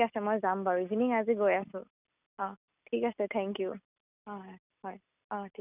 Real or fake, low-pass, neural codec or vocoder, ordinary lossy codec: real; 3.6 kHz; none; none